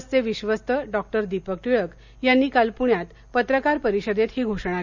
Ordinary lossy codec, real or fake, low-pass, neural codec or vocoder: none; real; 7.2 kHz; none